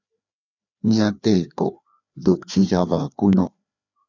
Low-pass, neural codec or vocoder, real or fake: 7.2 kHz; codec, 16 kHz, 2 kbps, FreqCodec, larger model; fake